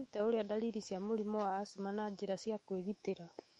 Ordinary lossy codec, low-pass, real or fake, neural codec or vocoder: MP3, 48 kbps; 19.8 kHz; fake; autoencoder, 48 kHz, 128 numbers a frame, DAC-VAE, trained on Japanese speech